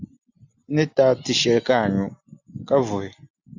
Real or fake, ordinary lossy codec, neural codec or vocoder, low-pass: real; Opus, 64 kbps; none; 7.2 kHz